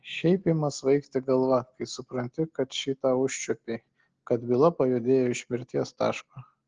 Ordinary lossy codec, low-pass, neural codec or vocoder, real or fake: Opus, 16 kbps; 7.2 kHz; none; real